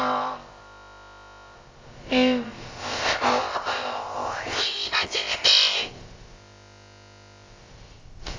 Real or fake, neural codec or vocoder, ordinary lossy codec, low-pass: fake; codec, 16 kHz, about 1 kbps, DyCAST, with the encoder's durations; Opus, 32 kbps; 7.2 kHz